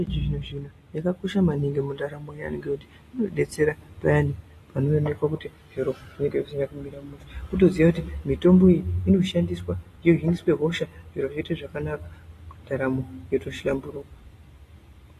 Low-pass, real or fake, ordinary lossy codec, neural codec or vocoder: 14.4 kHz; real; AAC, 48 kbps; none